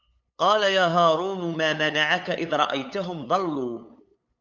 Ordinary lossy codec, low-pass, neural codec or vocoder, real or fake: MP3, 64 kbps; 7.2 kHz; codec, 16 kHz, 8 kbps, FunCodec, trained on LibriTTS, 25 frames a second; fake